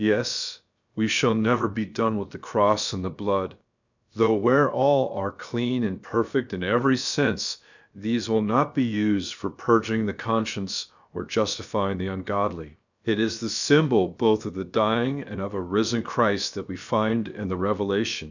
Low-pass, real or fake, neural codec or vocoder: 7.2 kHz; fake; codec, 16 kHz, about 1 kbps, DyCAST, with the encoder's durations